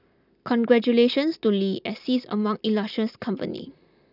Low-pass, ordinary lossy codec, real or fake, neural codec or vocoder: 5.4 kHz; none; real; none